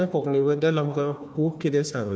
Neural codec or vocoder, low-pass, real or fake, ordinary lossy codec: codec, 16 kHz, 1 kbps, FunCodec, trained on Chinese and English, 50 frames a second; none; fake; none